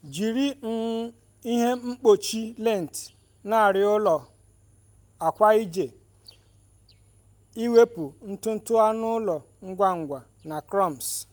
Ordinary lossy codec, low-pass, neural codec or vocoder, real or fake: none; none; none; real